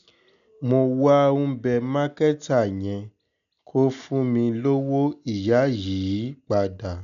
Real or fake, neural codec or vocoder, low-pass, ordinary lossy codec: real; none; 7.2 kHz; none